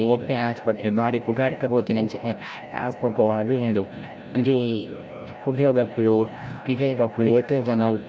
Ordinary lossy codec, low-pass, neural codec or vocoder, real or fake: none; none; codec, 16 kHz, 0.5 kbps, FreqCodec, larger model; fake